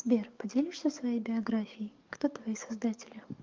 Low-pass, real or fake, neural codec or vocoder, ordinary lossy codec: 7.2 kHz; fake; codec, 44.1 kHz, 7.8 kbps, DAC; Opus, 32 kbps